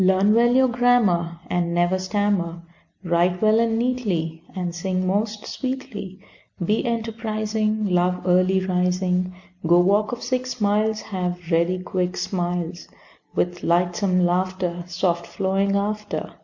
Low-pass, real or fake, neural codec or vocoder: 7.2 kHz; real; none